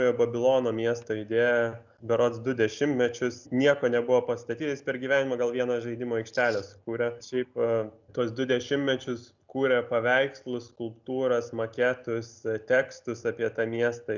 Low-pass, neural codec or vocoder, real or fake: 7.2 kHz; none; real